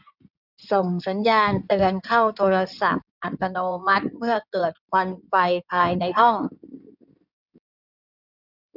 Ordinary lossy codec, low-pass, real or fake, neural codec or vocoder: none; 5.4 kHz; fake; codec, 16 kHz in and 24 kHz out, 2.2 kbps, FireRedTTS-2 codec